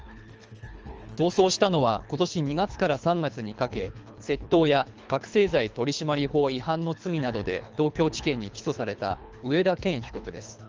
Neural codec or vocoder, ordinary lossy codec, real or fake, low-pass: codec, 24 kHz, 3 kbps, HILCodec; Opus, 24 kbps; fake; 7.2 kHz